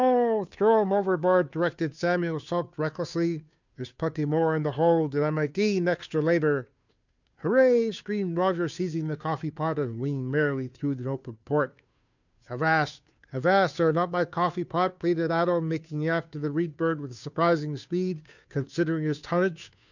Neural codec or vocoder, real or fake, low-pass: codec, 16 kHz, 2 kbps, FunCodec, trained on Chinese and English, 25 frames a second; fake; 7.2 kHz